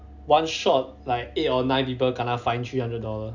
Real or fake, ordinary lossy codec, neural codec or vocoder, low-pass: real; none; none; 7.2 kHz